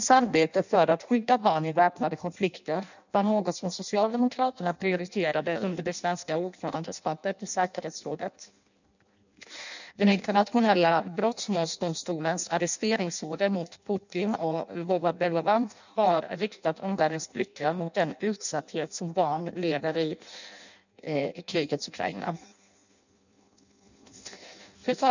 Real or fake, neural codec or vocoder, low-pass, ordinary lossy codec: fake; codec, 16 kHz in and 24 kHz out, 0.6 kbps, FireRedTTS-2 codec; 7.2 kHz; none